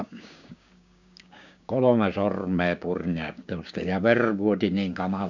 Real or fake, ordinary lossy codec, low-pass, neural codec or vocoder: fake; none; 7.2 kHz; codec, 16 kHz, 6 kbps, DAC